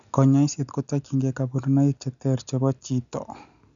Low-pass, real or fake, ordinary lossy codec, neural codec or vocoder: 7.2 kHz; real; none; none